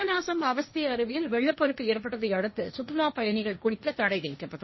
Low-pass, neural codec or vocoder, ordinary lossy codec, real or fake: 7.2 kHz; codec, 16 kHz, 1.1 kbps, Voila-Tokenizer; MP3, 24 kbps; fake